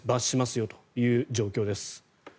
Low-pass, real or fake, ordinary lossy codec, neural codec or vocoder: none; real; none; none